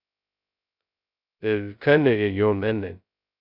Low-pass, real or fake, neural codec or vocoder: 5.4 kHz; fake; codec, 16 kHz, 0.2 kbps, FocalCodec